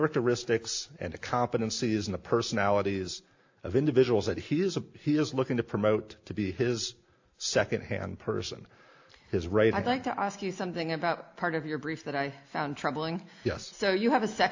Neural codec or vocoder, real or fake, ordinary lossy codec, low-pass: none; real; AAC, 48 kbps; 7.2 kHz